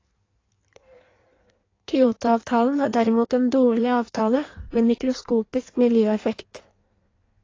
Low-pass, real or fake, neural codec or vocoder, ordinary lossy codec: 7.2 kHz; fake; codec, 16 kHz in and 24 kHz out, 1.1 kbps, FireRedTTS-2 codec; AAC, 32 kbps